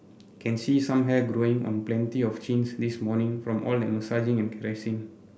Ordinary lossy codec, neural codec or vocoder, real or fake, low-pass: none; none; real; none